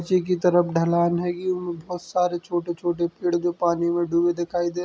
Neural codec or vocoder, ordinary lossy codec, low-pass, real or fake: none; none; none; real